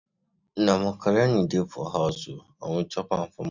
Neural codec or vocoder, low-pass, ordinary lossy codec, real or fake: none; 7.2 kHz; none; real